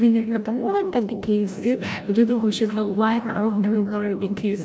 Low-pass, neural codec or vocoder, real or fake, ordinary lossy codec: none; codec, 16 kHz, 0.5 kbps, FreqCodec, larger model; fake; none